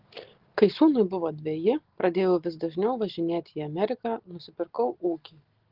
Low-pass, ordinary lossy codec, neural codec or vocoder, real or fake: 5.4 kHz; Opus, 16 kbps; none; real